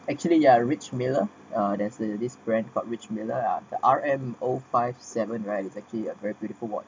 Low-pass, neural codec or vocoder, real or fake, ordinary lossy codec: none; none; real; none